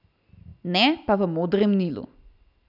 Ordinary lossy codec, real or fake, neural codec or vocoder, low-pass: none; real; none; 5.4 kHz